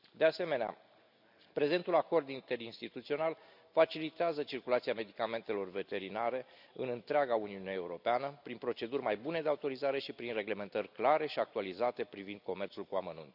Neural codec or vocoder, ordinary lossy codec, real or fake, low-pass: none; none; real; 5.4 kHz